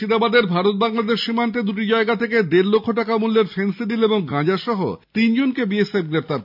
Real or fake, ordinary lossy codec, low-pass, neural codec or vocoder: real; AAC, 48 kbps; 5.4 kHz; none